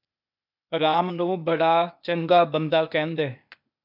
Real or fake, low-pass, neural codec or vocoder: fake; 5.4 kHz; codec, 16 kHz, 0.8 kbps, ZipCodec